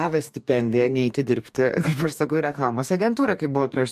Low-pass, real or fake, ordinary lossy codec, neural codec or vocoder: 14.4 kHz; fake; MP3, 96 kbps; codec, 44.1 kHz, 2.6 kbps, DAC